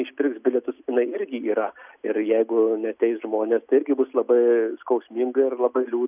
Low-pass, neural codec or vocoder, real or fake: 3.6 kHz; none; real